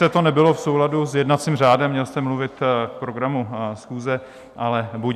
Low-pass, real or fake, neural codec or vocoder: 14.4 kHz; real; none